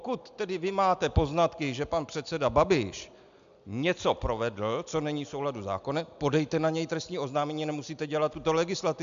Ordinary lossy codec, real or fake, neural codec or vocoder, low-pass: MP3, 64 kbps; real; none; 7.2 kHz